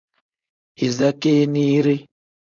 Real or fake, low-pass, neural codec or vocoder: fake; 7.2 kHz; codec, 16 kHz, 4.8 kbps, FACodec